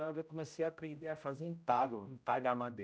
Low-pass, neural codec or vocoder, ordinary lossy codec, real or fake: none; codec, 16 kHz, 0.5 kbps, X-Codec, HuBERT features, trained on general audio; none; fake